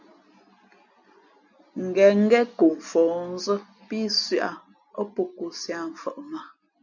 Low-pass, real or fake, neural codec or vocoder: 7.2 kHz; real; none